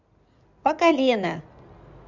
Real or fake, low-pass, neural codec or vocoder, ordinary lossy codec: fake; 7.2 kHz; codec, 16 kHz in and 24 kHz out, 2.2 kbps, FireRedTTS-2 codec; none